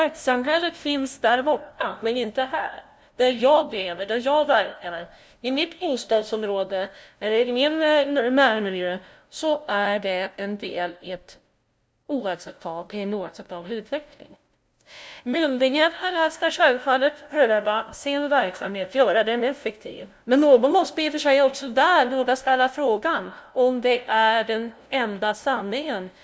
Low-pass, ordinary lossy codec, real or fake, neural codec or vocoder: none; none; fake; codec, 16 kHz, 0.5 kbps, FunCodec, trained on LibriTTS, 25 frames a second